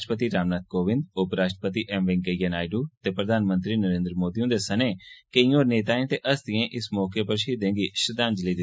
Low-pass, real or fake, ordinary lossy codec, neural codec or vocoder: none; real; none; none